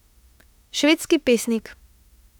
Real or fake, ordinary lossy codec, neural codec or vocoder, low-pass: fake; none; autoencoder, 48 kHz, 32 numbers a frame, DAC-VAE, trained on Japanese speech; 19.8 kHz